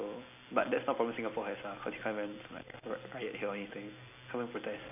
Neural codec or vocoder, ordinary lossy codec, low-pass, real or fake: none; AAC, 32 kbps; 3.6 kHz; real